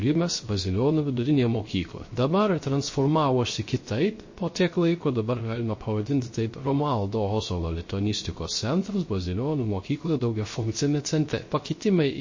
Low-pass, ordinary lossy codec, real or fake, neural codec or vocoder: 7.2 kHz; MP3, 32 kbps; fake; codec, 16 kHz, 0.3 kbps, FocalCodec